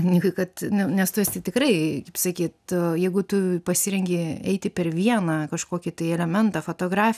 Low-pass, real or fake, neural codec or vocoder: 14.4 kHz; real; none